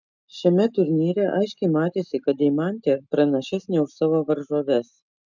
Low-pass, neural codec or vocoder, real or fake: 7.2 kHz; none; real